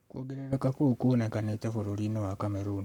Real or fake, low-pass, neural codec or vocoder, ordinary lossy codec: fake; 19.8 kHz; codec, 44.1 kHz, 7.8 kbps, Pupu-Codec; none